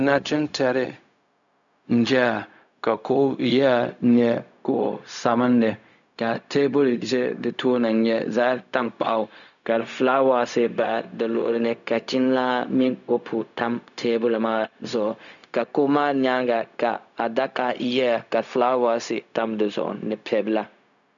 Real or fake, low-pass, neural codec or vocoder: fake; 7.2 kHz; codec, 16 kHz, 0.4 kbps, LongCat-Audio-Codec